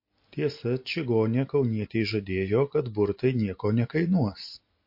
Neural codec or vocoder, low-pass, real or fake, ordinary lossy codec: none; 5.4 kHz; real; MP3, 32 kbps